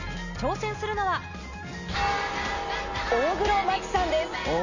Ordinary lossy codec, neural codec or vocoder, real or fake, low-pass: none; none; real; 7.2 kHz